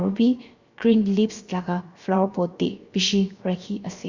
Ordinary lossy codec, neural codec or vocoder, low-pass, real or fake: Opus, 64 kbps; codec, 16 kHz, 0.7 kbps, FocalCodec; 7.2 kHz; fake